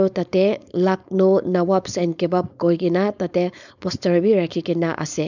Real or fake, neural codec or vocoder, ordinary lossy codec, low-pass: fake; codec, 16 kHz, 4.8 kbps, FACodec; none; 7.2 kHz